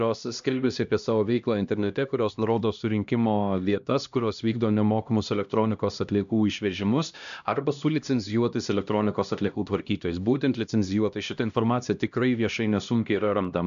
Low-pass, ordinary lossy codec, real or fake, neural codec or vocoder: 7.2 kHz; AAC, 96 kbps; fake; codec, 16 kHz, 1 kbps, X-Codec, HuBERT features, trained on LibriSpeech